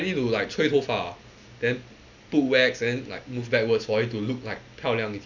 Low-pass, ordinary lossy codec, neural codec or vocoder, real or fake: 7.2 kHz; none; none; real